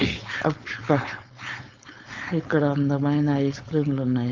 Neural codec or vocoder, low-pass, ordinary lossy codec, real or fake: codec, 16 kHz, 4.8 kbps, FACodec; 7.2 kHz; Opus, 24 kbps; fake